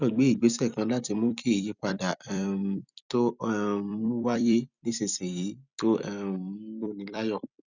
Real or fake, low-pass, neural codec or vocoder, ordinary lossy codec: fake; 7.2 kHz; vocoder, 44.1 kHz, 128 mel bands every 256 samples, BigVGAN v2; none